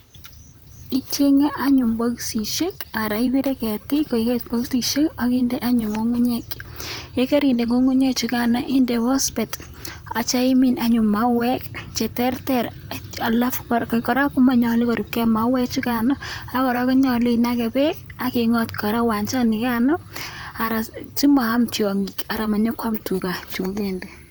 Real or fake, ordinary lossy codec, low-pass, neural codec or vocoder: fake; none; none; vocoder, 44.1 kHz, 128 mel bands, Pupu-Vocoder